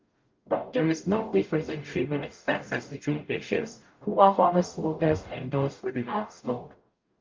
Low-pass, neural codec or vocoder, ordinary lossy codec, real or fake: 7.2 kHz; codec, 44.1 kHz, 0.9 kbps, DAC; Opus, 24 kbps; fake